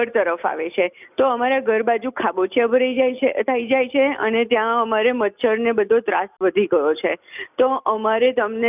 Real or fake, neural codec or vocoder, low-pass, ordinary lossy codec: real; none; 3.6 kHz; none